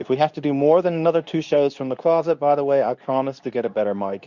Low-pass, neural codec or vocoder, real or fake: 7.2 kHz; codec, 24 kHz, 0.9 kbps, WavTokenizer, medium speech release version 2; fake